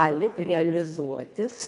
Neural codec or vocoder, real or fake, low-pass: codec, 24 kHz, 1.5 kbps, HILCodec; fake; 10.8 kHz